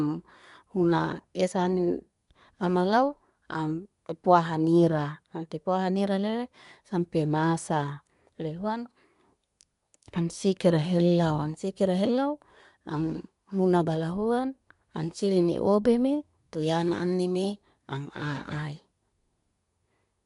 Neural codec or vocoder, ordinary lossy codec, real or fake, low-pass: codec, 24 kHz, 1 kbps, SNAC; none; fake; 10.8 kHz